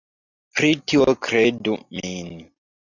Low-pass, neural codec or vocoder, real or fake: 7.2 kHz; none; real